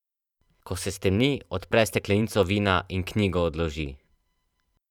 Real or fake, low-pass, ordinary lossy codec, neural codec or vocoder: fake; 19.8 kHz; none; vocoder, 44.1 kHz, 128 mel bands every 512 samples, BigVGAN v2